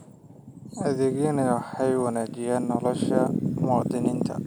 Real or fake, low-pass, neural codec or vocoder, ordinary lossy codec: real; none; none; none